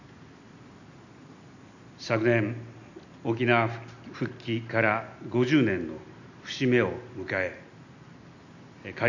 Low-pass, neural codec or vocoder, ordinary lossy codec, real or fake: 7.2 kHz; none; none; real